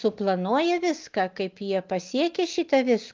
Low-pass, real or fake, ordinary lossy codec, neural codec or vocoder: 7.2 kHz; real; Opus, 32 kbps; none